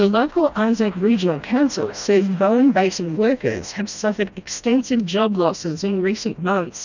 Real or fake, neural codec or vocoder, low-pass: fake; codec, 16 kHz, 1 kbps, FreqCodec, smaller model; 7.2 kHz